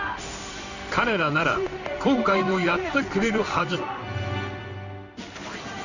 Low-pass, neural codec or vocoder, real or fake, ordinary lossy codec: 7.2 kHz; codec, 16 kHz in and 24 kHz out, 1 kbps, XY-Tokenizer; fake; none